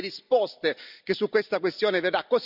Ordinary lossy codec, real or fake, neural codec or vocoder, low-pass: none; real; none; 5.4 kHz